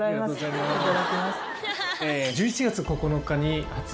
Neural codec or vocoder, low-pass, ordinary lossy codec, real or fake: none; none; none; real